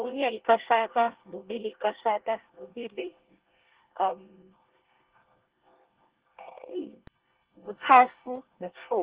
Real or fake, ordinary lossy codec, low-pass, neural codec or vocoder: fake; Opus, 16 kbps; 3.6 kHz; codec, 24 kHz, 1 kbps, SNAC